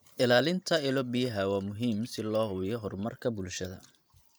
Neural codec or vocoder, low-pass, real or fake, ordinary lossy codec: vocoder, 44.1 kHz, 128 mel bands every 512 samples, BigVGAN v2; none; fake; none